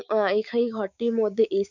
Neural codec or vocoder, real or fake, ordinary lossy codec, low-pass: codec, 16 kHz, 6 kbps, DAC; fake; none; 7.2 kHz